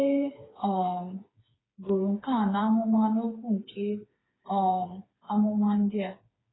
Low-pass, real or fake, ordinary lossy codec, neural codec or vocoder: 7.2 kHz; fake; AAC, 16 kbps; codec, 16 kHz, 4 kbps, FreqCodec, smaller model